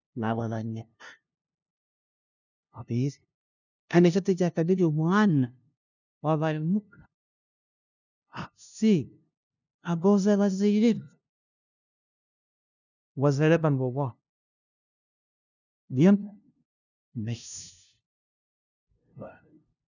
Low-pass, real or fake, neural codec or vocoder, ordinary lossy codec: 7.2 kHz; fake; codec, 16 kHz, 0.5 kbps, FunCodec, trained on LibriTTS, 25 frames a second; none